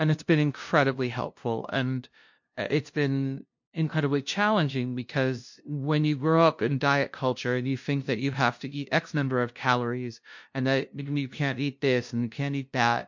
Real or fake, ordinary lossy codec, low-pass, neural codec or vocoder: fake; MP3, 48 kbps; 7.2 kHz; codec, 16 kHz, 0.5 kbps, FunCodec, trained on LibriTTS, 25 frames a second